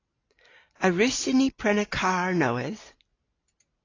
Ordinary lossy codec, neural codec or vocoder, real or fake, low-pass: AAC, 32 kbps; none; real; 7.2 kHz